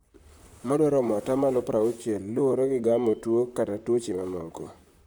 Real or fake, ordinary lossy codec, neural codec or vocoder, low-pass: fake; none; vocoder, 44.1 kHz, 128 mel bands, Pupu-Vocoder; none